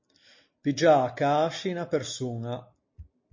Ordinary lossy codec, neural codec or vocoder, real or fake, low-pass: MP3, 32 kbps; none; real; 7.2 kHz